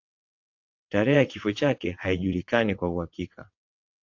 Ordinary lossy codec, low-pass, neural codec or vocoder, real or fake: AAC, 48 kbps; 7.2 kHz; vocoder, 22.05 kHz, 80 mel bands, WaveNeXt; fake